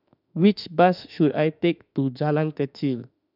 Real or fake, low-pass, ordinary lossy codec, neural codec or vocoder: fake; 5.4 kHz; none; autoencoder, 48 kHz, 32 numbers a frame, DAC-VAE, trained on Japanese speech